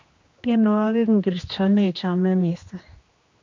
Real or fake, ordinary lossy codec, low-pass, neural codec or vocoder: fake; MP3, 48 kbps; 7.2 kHz; codec, 16 kHz, 2 kbps, X-Codec, HuBERT features, trained on general audio